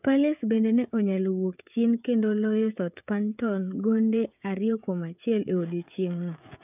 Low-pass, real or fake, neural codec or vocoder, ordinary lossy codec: 3.6 kHz; fake; codec, 16 kHz, 16 kbps, FreqCodec, smaller model; none